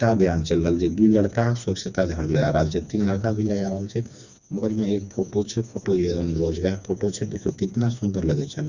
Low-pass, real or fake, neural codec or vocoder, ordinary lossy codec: 7.2 kHz; fake; codec, 16 kHz, 2 kbps, FreqCodec, smaller model; none